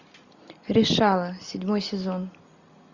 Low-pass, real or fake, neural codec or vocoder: 7.2 kHz; real; none